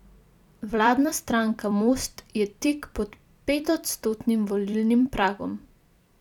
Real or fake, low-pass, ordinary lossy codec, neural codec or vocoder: fake; 19.8 kHz; none; vocoder, 44.1 kHz, 128 mel bands every 256 samples, BigVGAN v2